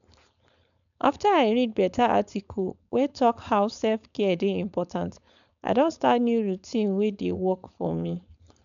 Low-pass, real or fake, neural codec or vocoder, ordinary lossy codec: 7.2 kHz; fake; codec, 16 kHz, 4.8 kbps, FACodec; none